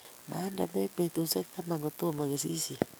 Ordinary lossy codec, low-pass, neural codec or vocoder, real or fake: none; none; codec, 44.1 kHz, 7.8 kbps, DAC; fake